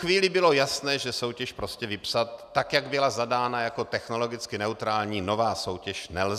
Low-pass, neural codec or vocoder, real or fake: 14.4 kHz; none; real